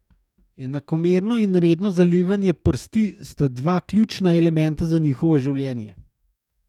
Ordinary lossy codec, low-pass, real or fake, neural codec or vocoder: none; 19.8 kHz; fake; codec, 44.1 kHz, 2.6 kbps, DAC